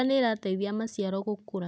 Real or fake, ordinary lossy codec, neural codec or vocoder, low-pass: real; none; none; none